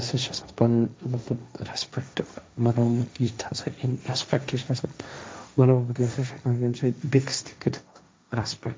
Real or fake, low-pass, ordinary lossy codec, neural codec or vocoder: fake; none; none; codec, 16 kHz, 1.1 kbps, Voila-Tokenizer